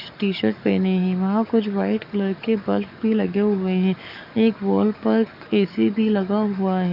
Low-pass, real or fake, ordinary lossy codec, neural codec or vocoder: 5.4 kHz; fake; none; codec, 44.1 kHz, 7.8 kbps, DAC